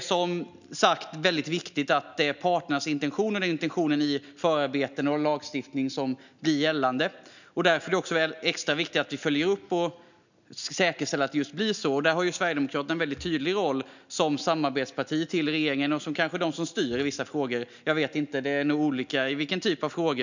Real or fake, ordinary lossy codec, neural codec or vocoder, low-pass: real; none; none; 7.2 kHz